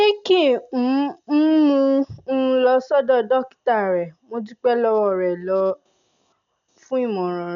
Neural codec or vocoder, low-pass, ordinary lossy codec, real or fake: none; 7.2 kHz; none; real